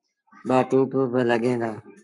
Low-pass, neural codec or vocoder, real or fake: 10.8 kHz; codec, 44.1 kHz, 7.8 kbps, Pupu-Codec; fake